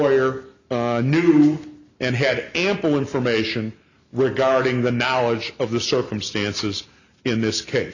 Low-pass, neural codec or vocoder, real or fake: 7.2 kHz; none; real